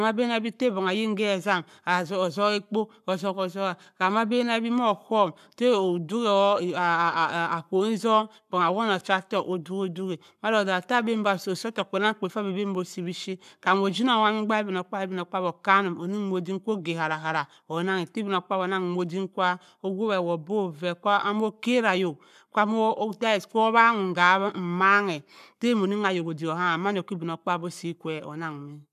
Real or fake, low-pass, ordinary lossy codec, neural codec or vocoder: fake; 14.4 kHz; none; codec, 44.1 kHz, 7.8 kbps, Pupu-Codec